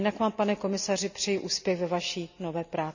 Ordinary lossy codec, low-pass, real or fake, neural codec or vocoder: none; 7.2 kHz; real; none